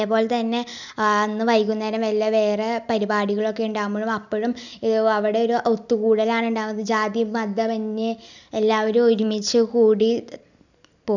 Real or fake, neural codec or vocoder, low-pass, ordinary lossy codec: real; none; 7.2 kHz; none